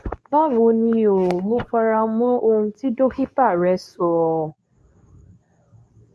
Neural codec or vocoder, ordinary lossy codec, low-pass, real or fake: codec, 24 kHz, 0.9 kbps, WavTokenizer, medium speech release version 2; none; none; fake